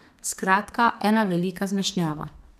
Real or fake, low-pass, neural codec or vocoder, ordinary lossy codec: fake; 14.4 kHz; codec, 32 kHz, 1.9 kbps, SNAC; none